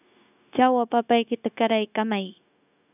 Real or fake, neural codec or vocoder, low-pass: fake; codec, 16 kHz, 0.9 kbps, LongCat-Audio-Codec; 3.6 kHz